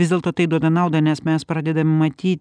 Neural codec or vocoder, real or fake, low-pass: none; real; 9.9 kHz